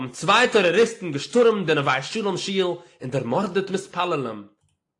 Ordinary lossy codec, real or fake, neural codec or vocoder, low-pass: AAC, 48 kbps; real; none; 10.8 kHz